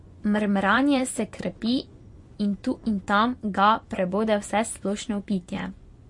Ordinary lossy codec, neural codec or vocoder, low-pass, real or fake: MP3, 48 kbps; vocoder, 24 kHz, 100 mel bands, Vocos; 10.8 kHz; fake